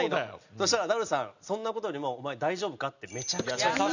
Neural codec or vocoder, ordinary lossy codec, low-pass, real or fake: none; none; 7.2 kHz; real